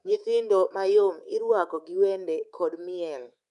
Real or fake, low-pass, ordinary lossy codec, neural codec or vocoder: fake; 10.8 kHz; none; codec, 24 kHz, 3.1 kbps, DualCodec